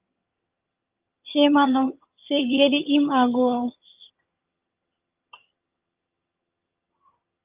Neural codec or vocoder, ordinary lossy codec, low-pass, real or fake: vocoder, 22.05 kHz, 80 mel bands, HiFi-GAN; Opus, 32 kbps; 3.6 kHz; fake